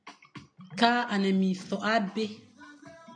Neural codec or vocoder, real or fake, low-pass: none; real; 9.9 kHz